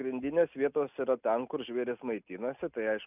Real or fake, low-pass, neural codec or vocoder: real; 3.6 kHz; none